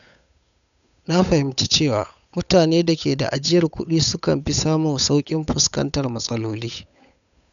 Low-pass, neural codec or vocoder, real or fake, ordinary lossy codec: 7.2 kHz; codec, 16 kHz, 8 kbps, FunCodec, trained on Chinese and English, 25 frames a second; fake; none